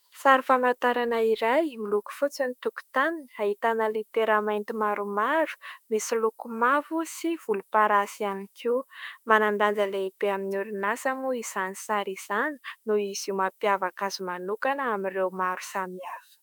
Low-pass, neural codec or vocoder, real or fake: 19.8 kHz; autoencoder, 48 kHz, 32 numbers a frame, DAC-VAE, trained on Japanese speech; fake